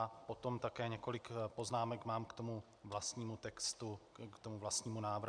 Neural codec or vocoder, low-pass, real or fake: none; 9.9 kHz; real